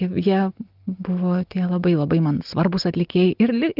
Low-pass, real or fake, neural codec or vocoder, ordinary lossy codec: 5.4 kHz; real; none; Opus, 32 kbps